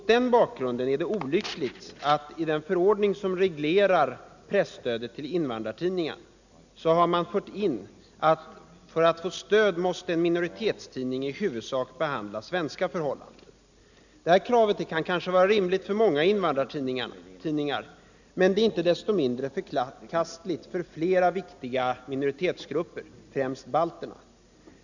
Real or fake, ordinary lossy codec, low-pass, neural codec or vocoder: real; none; 7.2 kHz; none